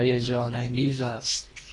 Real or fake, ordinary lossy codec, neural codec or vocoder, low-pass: fake; AAC, 32 kbps; codec, 24 kHz, 1.5 kbps, HILCodec; 10.8 kHz